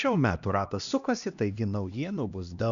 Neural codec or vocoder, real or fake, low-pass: codec, 16 kHz, 1 kbps, X-Codec, HuBERT features, trained on LibriSpeech; fake; 7.2 kHz